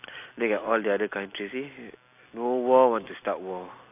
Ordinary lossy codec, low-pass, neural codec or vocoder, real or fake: AAC, 32 kbps; 3.6 kHz; none; real